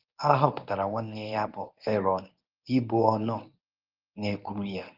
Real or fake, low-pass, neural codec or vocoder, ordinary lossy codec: fake; 5.4 kHz; codec, 24 kHz, 0.9 kbps, WavTokenizer, medium speech release version 1; Opus, 24 kbps